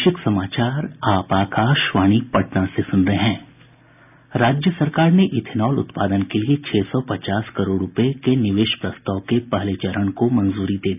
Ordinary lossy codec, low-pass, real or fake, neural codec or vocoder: none; 3.6 kHz; real; none